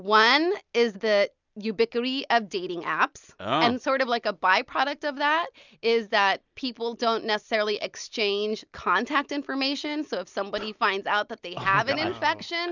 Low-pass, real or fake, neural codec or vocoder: 7.2 kHz; real; none